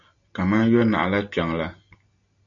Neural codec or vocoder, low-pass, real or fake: none; 7.2 kHz; real